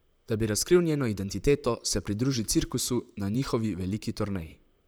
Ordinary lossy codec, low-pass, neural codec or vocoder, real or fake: none; none; vocoder, 44.1 kHz, 128 mel bands, Pupu-Vocoder; fake